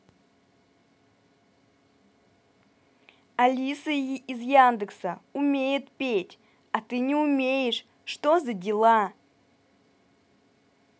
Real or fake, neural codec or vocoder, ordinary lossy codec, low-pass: real; none; none; none